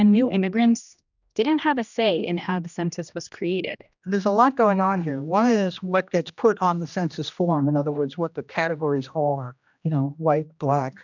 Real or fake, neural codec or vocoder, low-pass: fake; codec, 16 kHz, 1 kbps, X-Codec, HuBERT features, trained on general audio; 7.2 kHz